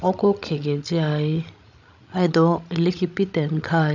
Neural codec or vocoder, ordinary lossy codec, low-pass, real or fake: codec, 16 kHz, 16 kbps, FreqCodec, larger model; none; 7.2 kHz; fake